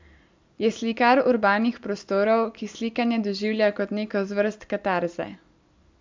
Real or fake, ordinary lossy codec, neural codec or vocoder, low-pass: real; MP3, 64 kbps; none; 7.2 kHz